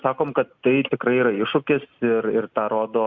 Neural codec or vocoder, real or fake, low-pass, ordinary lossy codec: none; real; 7.2 kHz; Opus, 64 kbps